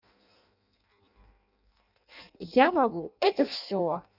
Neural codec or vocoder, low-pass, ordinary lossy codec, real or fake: codec, 16 kHz in and 24 kHz out, 0.6 kbps, FireRedTTS-2 codec; 5.4 kHz; none; fake